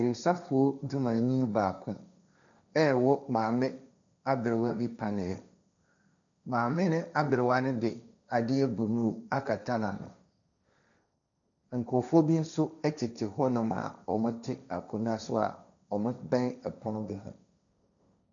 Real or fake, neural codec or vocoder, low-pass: fake; codec, 16 kHz, 1.1 kbps, Voila-Tokenizer; 7.2 kHz